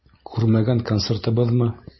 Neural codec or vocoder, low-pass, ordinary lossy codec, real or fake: none; 7.2 kHz; MP3, 24 kbps; real